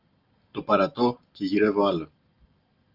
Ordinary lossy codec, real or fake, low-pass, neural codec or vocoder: Opus, 32 kbps; real; 5.4 kHz; none